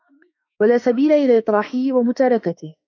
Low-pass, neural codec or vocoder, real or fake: 7.2 kHz; autoencoder, 48 kHz, 32 numbers a frame, DAC-VAE, trained on Japanese speech; fake